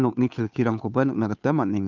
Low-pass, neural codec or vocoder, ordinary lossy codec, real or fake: 7.2 kHz; codec, 16 kHz, 2 kbps, FunCodec, trained on Chinese and English, 25 frames a second; none; fake